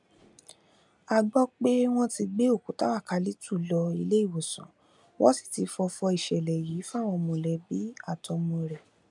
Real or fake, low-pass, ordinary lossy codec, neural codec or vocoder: real; 10.8 kHz; none; none